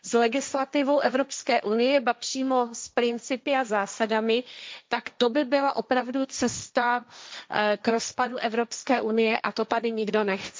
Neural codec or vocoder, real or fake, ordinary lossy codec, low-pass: codec, 16 kHz, 1.1 kbps, Voila-Tokenizer; fake; none; none